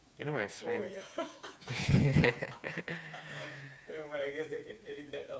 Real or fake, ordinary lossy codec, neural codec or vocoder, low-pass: fake; none; codec, 16 kHz, 4 kbps, FreqCodec, smaller model; none